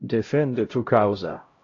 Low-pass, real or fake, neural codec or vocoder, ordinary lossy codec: 7.2 kHz; fake; codec, 16 kHz, 0.5 kbps, X-Codec, HuBERT features, trained on LibriSpeech; AAC, 32 kbps